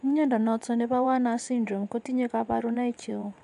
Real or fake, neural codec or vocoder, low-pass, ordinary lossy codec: fake; vocoder, 24 kHz, 100 mel bands, Vocos; 10.8 kHz; MP3, 96 kbps